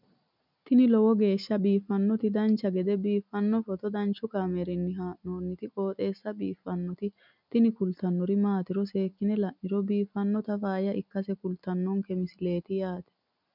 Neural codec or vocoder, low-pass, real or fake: none; 5.4 kHz; real